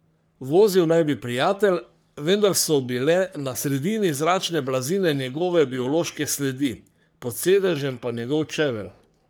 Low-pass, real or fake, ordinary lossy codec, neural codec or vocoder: none; fake; none; codec, 44.1 kHz, 3.4 kbps, Pupu-Codec